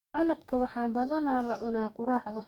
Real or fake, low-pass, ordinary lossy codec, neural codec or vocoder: fake; 19.8 kHz; Opus, 64 kbps; codec, 44.1 kHz, 2.6 kbps, DAC